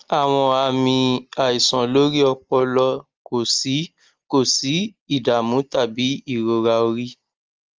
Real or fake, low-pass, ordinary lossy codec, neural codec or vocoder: real; 7.2 kHz; Opus, 24 kbps; none